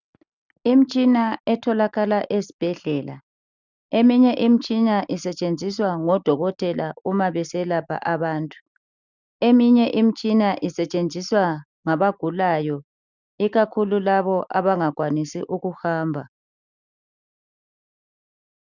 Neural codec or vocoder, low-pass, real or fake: none; 7.2 kHz; real